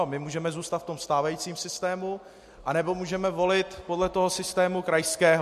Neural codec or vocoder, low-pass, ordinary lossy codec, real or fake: none; 14.4 kHz; MP3, 64 kbps; real